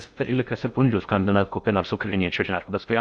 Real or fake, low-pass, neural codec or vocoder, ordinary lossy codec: fake; 9.9 kHz; codec, 16 kHz in and 24 kHz out, 0.6 kbps, FocalCodec, streaming, 2048 codes; Opus, 64 kbps